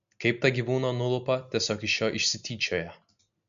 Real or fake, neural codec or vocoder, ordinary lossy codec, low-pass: real; none; MP3, 64 kbps; 7.2 kHz